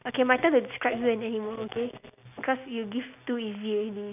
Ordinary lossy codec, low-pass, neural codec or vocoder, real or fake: none; 3.6 kHz; none; real